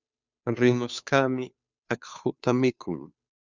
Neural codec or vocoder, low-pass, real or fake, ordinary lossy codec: codec, 16 kHz, 2 kbps, FunCodec, trained on Chinese and English, 25 frames a second; 7.2 kHz; fake; Opus, 64 kbps